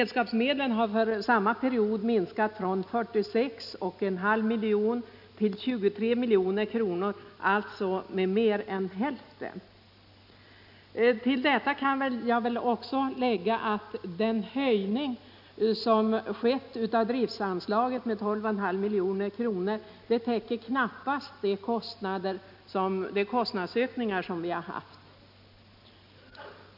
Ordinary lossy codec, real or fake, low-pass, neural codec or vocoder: none; real; 5.4 kHz; none